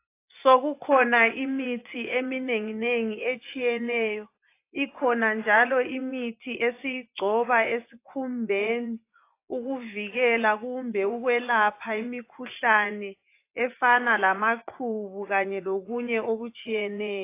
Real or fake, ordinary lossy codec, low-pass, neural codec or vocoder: fake; AAC, 24 kbps; 3.6 kHz; vocoder, 24 kHz, 100 mel bands, Vocos